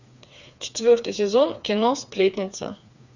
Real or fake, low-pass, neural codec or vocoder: fake; 7.2 kHz; codec, 16 kHz, 4 kbps, FreqCodec, smaller model